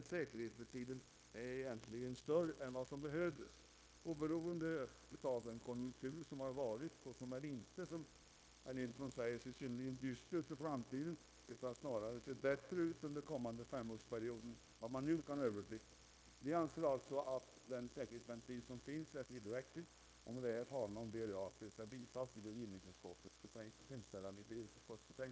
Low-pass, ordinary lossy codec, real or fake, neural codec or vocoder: none; none; fake; codec, 16 kHz, 0.9 kbps, LongCat-Audio-Codec